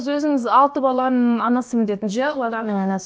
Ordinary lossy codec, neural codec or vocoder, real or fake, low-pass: none; codec, 16 kHz, about 1 kbps, DyCAST, with the encoder's durations; fake; none